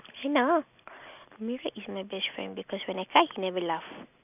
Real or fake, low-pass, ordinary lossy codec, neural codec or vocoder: real; 3.6 kHz; none; none